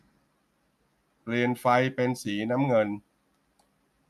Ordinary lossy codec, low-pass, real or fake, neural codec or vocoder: MP3, 96 kbps; 14.4 kHz; real; none